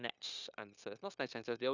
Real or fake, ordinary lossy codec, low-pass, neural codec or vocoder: fake; none; 7.2 kHz; codec, 16 kHz, 0.9 kbps, LongCat-Audio-Codec